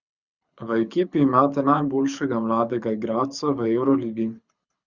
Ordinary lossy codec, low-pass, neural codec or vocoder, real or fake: Opus, 64 kbps; 7.2 kHz; codec, 24 kHz, 6 kbps, HILCodec; fake